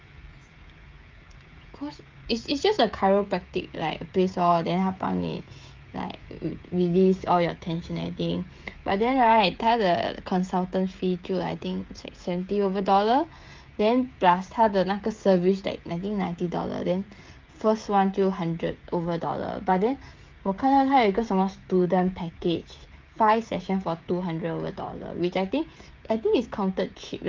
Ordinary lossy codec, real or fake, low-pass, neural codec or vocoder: Opus, 24 kbps; fake; 7.2 kHz; codec, 16 kHz, 16 kbps, FreqCodec, smaller model